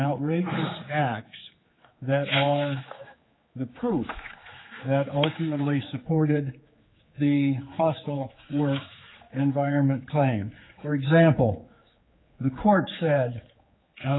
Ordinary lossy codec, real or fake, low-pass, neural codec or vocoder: AAC, 16 kbps; fake; 7.2 kHz; codec, 16 kHz, 4 kbps, X-Codec, HuBERT features, trained on general audio